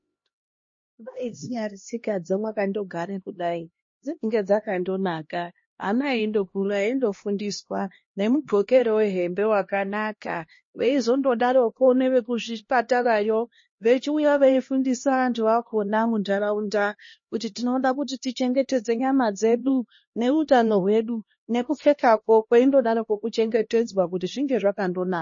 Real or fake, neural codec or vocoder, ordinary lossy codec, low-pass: fake; codec, 16 kHz, 1 kbps, X-Codec, HuBERT features, trained on LibriSpeech; MP3, 32 kbps; 7.2 kHz